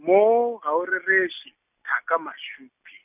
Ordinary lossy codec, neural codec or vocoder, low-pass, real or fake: none; none; 3.6 kHz; real